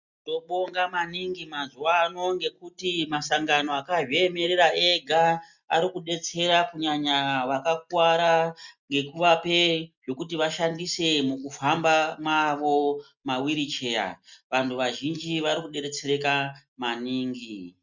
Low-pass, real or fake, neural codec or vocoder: 7.2 kHz; real; none